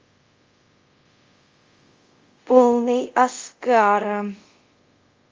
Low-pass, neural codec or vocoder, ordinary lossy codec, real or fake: 7.2 kHz; codec, 24 kHz, 0.5 kbps, DualCodec; Opus, 32 kbps; fake